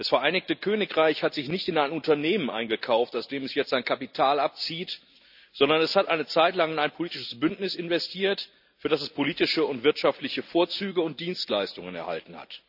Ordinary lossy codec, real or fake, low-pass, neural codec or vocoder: none; real; 5.4 kHz; none